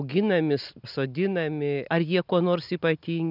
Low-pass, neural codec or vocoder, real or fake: 5.4 kHz; none; real